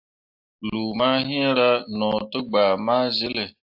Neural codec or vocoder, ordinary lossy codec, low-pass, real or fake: none; AAC, 48 kbps; 5.4 kHz; real